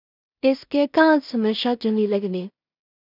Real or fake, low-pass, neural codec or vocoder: fake; 5.4 kHz; codec, 16 kHz in and 24 kHz out, 0.4 kbps, LongCat-Audio-Codec, two codebook decoder